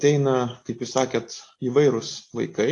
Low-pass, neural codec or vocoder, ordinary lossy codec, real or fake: 10.8 kHz; none; AAC, 48 kbps; real